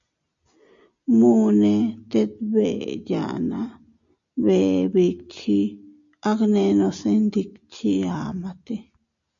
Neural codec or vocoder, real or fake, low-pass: none; real; 7.2 kHz